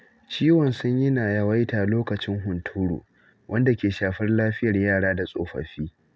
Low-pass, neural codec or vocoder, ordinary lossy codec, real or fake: none; none; none; real